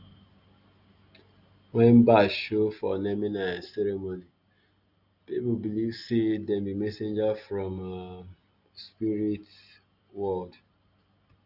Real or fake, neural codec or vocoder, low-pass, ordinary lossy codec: real; none; 5.4 kHz; none